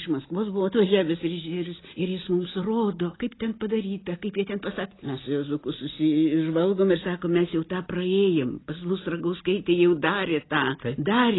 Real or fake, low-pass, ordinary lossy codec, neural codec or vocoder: real; 7.2 kHz; AAC, 16 kbps; none